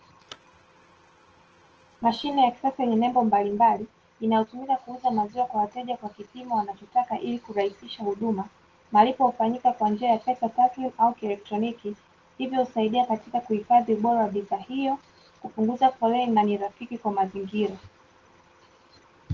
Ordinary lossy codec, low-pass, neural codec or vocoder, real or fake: Opus, 16 kbps; 7.2 kHz; none; real